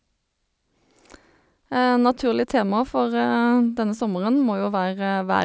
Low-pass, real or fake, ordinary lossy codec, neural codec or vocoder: none; real; none; none